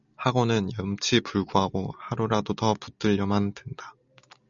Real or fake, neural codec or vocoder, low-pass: real; none; 7.2 kHz